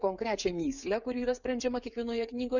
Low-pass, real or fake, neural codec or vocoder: 7.2 kHz; fake; codec, 16 kHz, 16 kbps, FreqCodec, smaller model